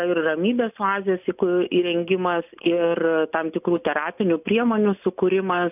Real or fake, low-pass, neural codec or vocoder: real; 3.6 kHz; none